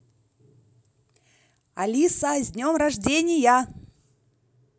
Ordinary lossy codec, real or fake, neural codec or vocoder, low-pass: none; real; none; none